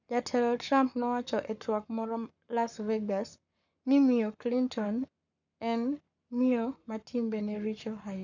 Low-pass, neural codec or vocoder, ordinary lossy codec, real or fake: 7.2 kHz; codec, 44.1 kHz, 7.8 kbps, Pupu-Codec; none; fake